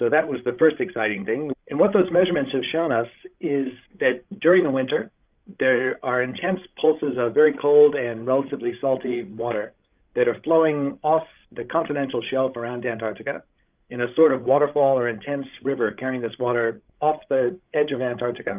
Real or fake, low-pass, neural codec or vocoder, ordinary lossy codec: fake; 3.6 kHz; codec, 16 kHz, 16 kbps, FreqCodec, larger model; Opus, 24 kbps